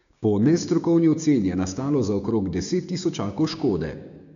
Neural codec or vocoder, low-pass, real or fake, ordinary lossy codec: codec, 16 kHz, 6 kbps, DAC; 7.2 kHz; fake; none